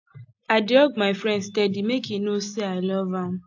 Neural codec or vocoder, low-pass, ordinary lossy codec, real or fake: none; 7.2 kHz; AAC, 48 kbps; real